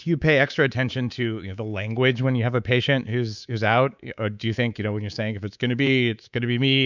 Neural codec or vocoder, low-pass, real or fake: codec, 24 kHz, 3.1 kbps, DualCodec; 7.2 kHz; fake